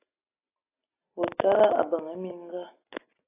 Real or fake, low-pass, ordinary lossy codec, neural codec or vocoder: real; 3.6 kHz; AAC, 32 kbps; none